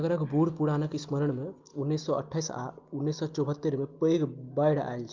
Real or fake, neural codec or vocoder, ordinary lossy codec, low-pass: real; none; Opus, 32 kbps; 7.2 kHz